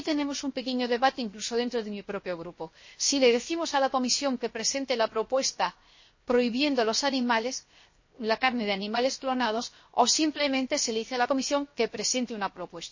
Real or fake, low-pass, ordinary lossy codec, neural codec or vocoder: fake; 7.2 kHz; MP3, 32 kbps; codec, 16 kHz, 0.7 kbps, FocalCodec